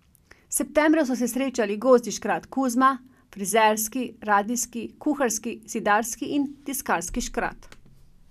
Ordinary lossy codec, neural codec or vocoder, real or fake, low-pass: none; none; real; 14.4 kHz